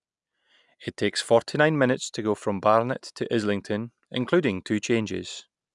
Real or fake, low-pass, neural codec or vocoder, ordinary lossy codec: real; 10.8 kHz; none; none